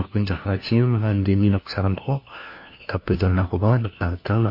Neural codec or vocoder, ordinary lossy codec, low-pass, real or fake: codec, 16 kHz, 1 kbps, FunCodec, trained on LibriTTS, 50 frames a second; MP3, 32 kbps; 5.4 kHz; fake